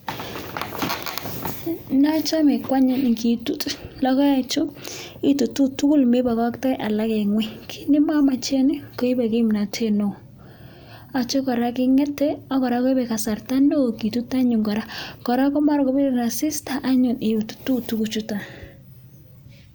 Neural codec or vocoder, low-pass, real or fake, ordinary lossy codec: none; none; real; none